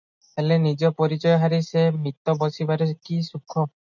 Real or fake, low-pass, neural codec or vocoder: real; 7.2 kHz; none